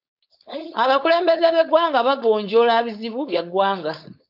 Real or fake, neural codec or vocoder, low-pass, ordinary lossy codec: fake; codec, 16 kHz, 4.8 kbps, FACodec; 5.4 kHz; AAC, 48 kbps